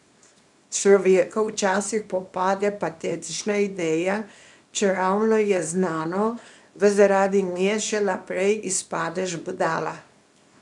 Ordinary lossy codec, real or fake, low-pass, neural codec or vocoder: none; fake; 10.8 kHz; codec, 24 kHz, 0.9 kbps, WavTokenizer, small release